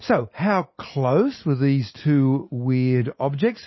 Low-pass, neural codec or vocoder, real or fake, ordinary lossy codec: 7.2 kHz; none; real; MP3, 24 kbps